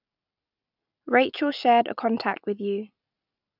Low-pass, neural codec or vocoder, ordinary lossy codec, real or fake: 5.4 kHz; none; none; real